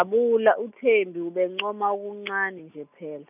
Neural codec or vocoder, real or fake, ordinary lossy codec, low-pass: none; real; none; 3.6 kHz